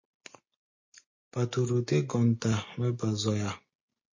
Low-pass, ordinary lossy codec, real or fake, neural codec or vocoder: 7.2 kHz; MP3, 32 kbps; real; none